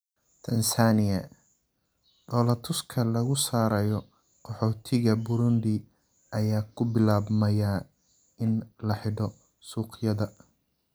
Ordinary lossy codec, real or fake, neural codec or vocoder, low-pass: none; real; none; none